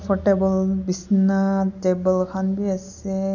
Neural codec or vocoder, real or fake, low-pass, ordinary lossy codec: none; real; 7.2 kHz; none